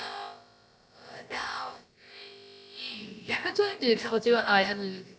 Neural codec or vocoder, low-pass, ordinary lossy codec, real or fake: codec, 16 kHz, about 1 kbps, DyCAST, with the encoder's durations; none; none; fake